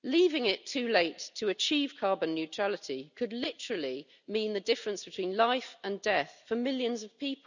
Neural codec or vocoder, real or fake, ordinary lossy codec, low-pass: none; real; none; 7.2 kHz